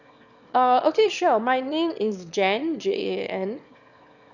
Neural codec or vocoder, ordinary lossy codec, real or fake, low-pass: autoencoder, 22.05 kHz, a latent of 192 numbers a frame, VITS, trained on one speaker; none; fake; 7.2 kHz